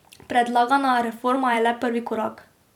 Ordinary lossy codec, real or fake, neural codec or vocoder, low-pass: none; fake; vocoder, 44.1 kHz, 128 mel bands every 256 samples, BigVGAN v2; 19.8 kHz